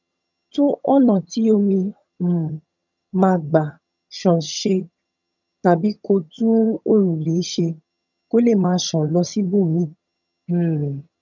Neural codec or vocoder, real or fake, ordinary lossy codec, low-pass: vocoder, 22.05 kHz, 80 mel bands, HiFi-GAN; fake; none; 7.2 kHz